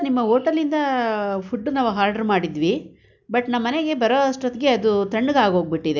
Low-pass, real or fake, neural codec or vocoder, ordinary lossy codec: 7.2 kHz; real; none; none